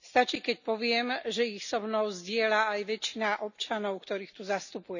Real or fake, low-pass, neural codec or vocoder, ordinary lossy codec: real; 7.2 kHz; none; none